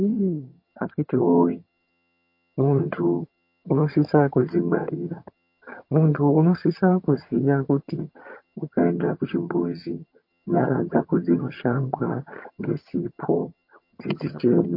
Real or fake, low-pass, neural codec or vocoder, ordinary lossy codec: fake; 5.4 kHz; vocoder, 22.05 kHz, 80 mel bands, HiFi-GAN; MP3, 32 kbps